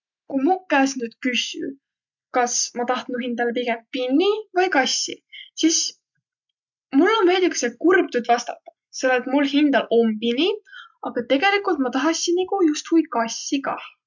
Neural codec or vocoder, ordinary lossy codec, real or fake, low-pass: none; none; real; 7.2 kHz